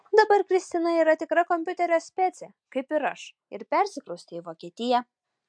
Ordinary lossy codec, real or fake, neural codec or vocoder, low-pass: MP3, 64 kbps; real; none; 9.9 kHz